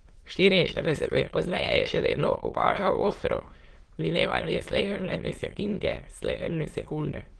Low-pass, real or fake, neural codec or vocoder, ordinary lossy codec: 9.9 kHz; fake; autoencoder, 22.05 kHz, a latent of 192 numbers a frame, VITS, trained on many speakers; Opus, 16 kbps